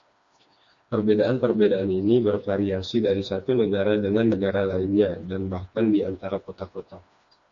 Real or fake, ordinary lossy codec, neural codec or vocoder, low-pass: fake; MP3, 48 kbps; codec, 16 kHz, 2 kbps, FreqCodec, smaller model; 7.2 kHz